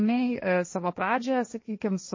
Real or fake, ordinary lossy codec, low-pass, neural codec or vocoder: fake; MP3, 32 kbps; 7.2 kHz; codec, 16 kHz, 1.1 kbps, Voila-Tokenizer